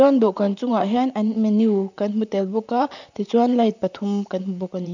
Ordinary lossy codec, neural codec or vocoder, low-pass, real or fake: none; vocoder, 44.1 kHz, 128 mel bands, Pupu-Vocoder; 7.2 kHz; fake